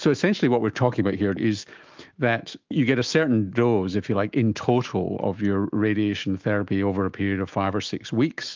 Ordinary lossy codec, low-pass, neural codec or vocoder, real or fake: Opus, 24 kbps; 7.2 kHz; autoencoder, 48 kHz, 128 numbers a frame, DAC-VAE, trained on Japanese speech; fake